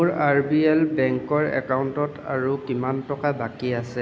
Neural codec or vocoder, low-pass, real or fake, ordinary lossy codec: none; none; real; none